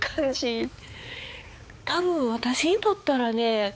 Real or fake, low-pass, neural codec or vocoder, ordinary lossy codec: fake; none; codec, 16 kHz, 4 kbps, X-Codec, HuBERT features, trained on balanced general audio; none